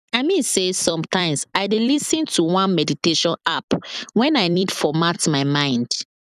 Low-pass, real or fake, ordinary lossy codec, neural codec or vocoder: 14.4 kHz; fake; none; vocoder, 44.1 kHz, 128 mel bands every 256 samples, BigVGAN v2